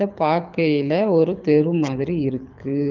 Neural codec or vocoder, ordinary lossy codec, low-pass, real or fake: codec, 24 kHz, 6 kbps, HILCodec; Opus, 32 kbps; 7.2 kHz; fake